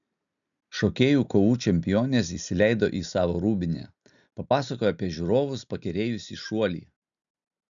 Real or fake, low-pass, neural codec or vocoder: real; 7.2 kHz; none